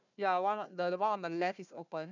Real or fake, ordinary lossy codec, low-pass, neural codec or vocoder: fake; none; 7.2 kHz; codec, 16 kHz, 1 kbps, FunCodec, trained on Chinese and English, 50 frames a second